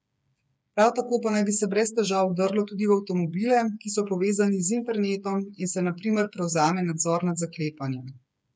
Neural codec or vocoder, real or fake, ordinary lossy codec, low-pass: codec, 16 kHz, 8 kbps, FreqCodec, smaller model; fake; none; none